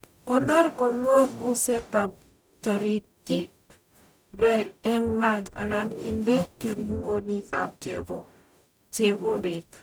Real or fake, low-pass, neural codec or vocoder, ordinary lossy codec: fake; none; codec, 44.1 kHz, 0.9 kbps, DAC; none